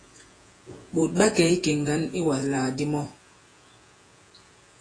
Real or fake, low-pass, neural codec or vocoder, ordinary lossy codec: fake; 9.9 kHz; vocoder, 48 kHz, 128 mel bands, Vocos; AAC, 32 kbps